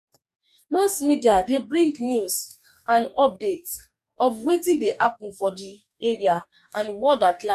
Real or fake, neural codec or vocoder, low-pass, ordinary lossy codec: fake; codec, 44.1 kHz, 2.6 kbps, DAC; 14.4 kHz; none